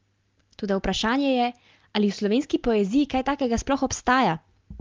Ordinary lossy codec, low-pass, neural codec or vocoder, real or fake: Opus, 32 kbps; 7.2 kHz; none; real